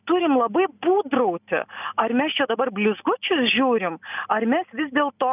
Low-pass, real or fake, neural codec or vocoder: 3.6 kHz; real; none